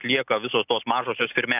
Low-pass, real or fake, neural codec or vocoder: 3.6 kHz; real; none